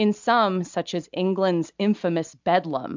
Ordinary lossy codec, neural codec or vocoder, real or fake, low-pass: MP3, 64 kbps; none; real; 7.2 kHz